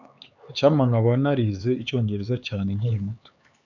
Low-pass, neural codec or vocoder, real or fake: 7.2 kHz; codec, 16 kHz, 4 kbps, X-Codec, HuBERT features, trained on LibriSpeech; fake